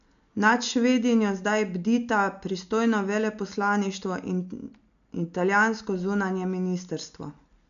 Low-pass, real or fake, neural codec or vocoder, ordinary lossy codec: 7.2 kHz; real; none; none